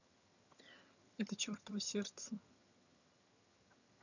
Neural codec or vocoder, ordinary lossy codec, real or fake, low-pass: vocoder, 22.05 kHz, 80 mel bands, HiFi-GAN; none; fake; 7.2 kHz